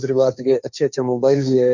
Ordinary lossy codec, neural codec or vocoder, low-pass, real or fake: none; codec, 16 kHz, 1.1 kbps, Voila-Tokenizer; 7.2 kHz; fake